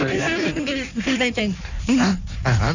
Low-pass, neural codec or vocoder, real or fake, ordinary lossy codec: 7.2 kHz; codec, 16 kHz in and 24 kHz out, 1.1 kbps, FireRedTTS-2 codec; fake; none